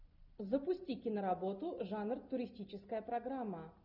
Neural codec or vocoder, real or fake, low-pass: none; real; 5.4 kHz